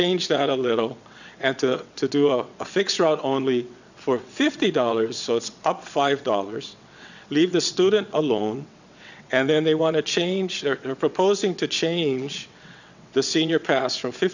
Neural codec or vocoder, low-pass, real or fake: vocoder, 22.05 kHz, 80 mel bands, WaveNeXt; 7.2 kHz; fake